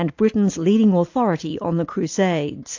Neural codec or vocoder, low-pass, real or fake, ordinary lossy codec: none; 7.2 kHz; real; AAC, 48 kbps